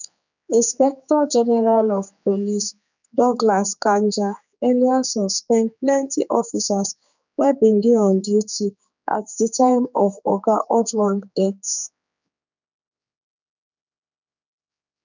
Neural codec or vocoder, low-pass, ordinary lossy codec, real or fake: codec, 16 kHz, 4 kbps, X-Codec, HuBERT features, trained on general audio; 7.2 kHz; none; fake